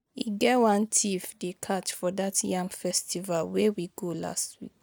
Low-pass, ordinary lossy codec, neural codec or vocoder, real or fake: none; none; none; real